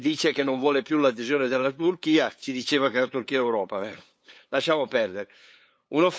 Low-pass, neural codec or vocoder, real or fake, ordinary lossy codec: none; codec, 16 kHz, 8 kbps, FunCodec, trained on LibriTTS, 25 frames a second; fake; none